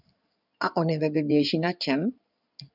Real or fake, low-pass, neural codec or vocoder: fake; 5.4 kHz; codec, 16 kHz in and 24 kHz out, 2.2 kbps, FireRedTTS-2 codec